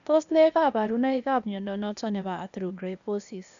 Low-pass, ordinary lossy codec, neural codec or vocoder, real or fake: 7.2 kHz; none; codec, 16 kHz, 0.8 kbps, ZipCodec; fake